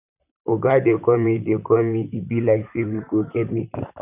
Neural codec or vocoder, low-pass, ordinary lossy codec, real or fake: vocoder, 44.1 kHz, 128 mel bands, Pupu-Vocoder; 3.6 kHz; none; fake